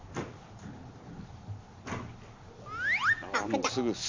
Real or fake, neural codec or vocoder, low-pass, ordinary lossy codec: real; none; 7.2 kHz; MP3, 64 kbps